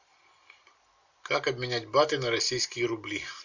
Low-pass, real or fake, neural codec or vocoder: 7.2 kHz; real; none